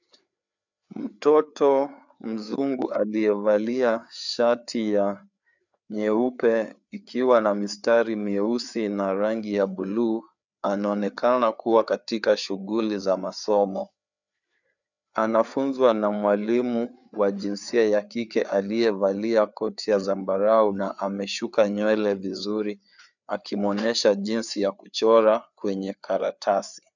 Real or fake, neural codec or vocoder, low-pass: fake; codec, 16 kHz, 4 kbps, FreqCodec, larger model; 7.2 kHz